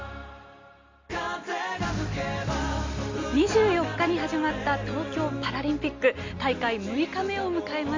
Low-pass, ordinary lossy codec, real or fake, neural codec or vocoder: 7.2 kHz; MP3, 48 kbps; real; none